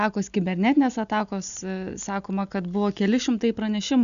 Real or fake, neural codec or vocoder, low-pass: real; none; 7.2 kHz